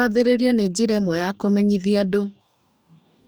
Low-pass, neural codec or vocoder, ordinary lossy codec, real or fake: none; codec, 44.1 kHz, 2.6 kbps, DAC; none; fake